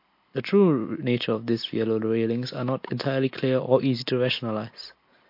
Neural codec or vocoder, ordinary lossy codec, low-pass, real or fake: none; MP3, 32 kbps; 5.4 kHz; real